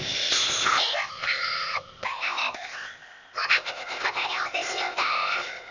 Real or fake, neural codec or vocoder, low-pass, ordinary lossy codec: fake; codec, 16 kHz, 0.8 kbps, ZipCodec; 7.2 kHz; none